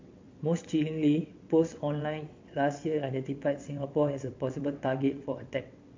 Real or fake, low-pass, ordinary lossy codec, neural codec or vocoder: fake; 7.2 kHz; MP3, 48 kbps; vocoder, 22.05 kHz, 80 mel bands, WaveNeXt